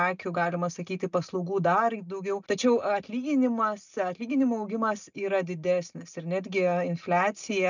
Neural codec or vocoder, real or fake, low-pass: none; real; 7.2 kHz